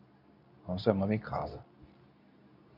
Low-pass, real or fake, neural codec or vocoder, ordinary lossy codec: 5.4 kHz; fake; codec, 24 kHz, 0.9 kbps, WavTokenizer, medium speech release version 2; none